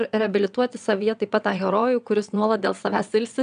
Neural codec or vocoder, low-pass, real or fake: vocoder, 22.05 kHz, 80 mel bands, WaveNeXt; 9.9 kHz; fake